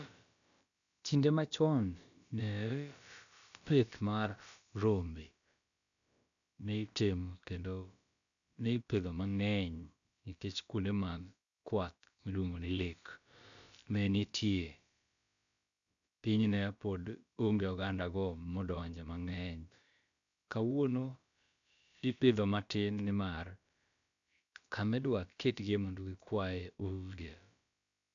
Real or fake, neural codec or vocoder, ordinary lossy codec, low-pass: fake; codec, 16 kHz, about 1 kbps, DyCAST, with the encoder's durations; none; 7.2 kHz